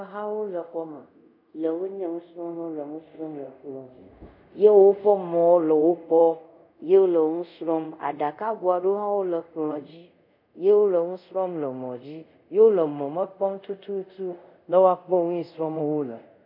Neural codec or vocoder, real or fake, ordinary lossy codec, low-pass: codec, 24 kHz, 0.5 kbps, DualCodec; fake; MP3, 48 kbps; 5.4 kHz